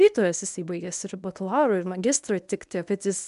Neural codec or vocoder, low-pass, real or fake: codec, 24 kHz, 0.9 kbps, WavTokenizer, medium speech release version 1; 10.8 kHz; fake